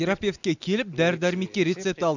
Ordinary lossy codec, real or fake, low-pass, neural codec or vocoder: none; real; 7.2 kHz; none